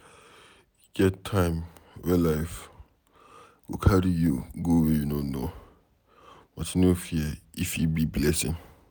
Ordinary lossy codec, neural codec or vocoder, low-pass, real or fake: none; none; none; real